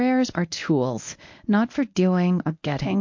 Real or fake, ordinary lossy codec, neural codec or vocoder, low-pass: fake; MP3, 48 kbps; codec, 24 kHz, 0.9 kbps, WavTokenizer, medium speech release version 1; 7.2 kHz